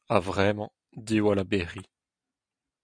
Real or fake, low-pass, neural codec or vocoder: real; 9.9 kHz; none